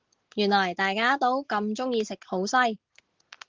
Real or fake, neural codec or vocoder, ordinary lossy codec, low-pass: real; none; Opus, 16 kbps; 7.2 kHz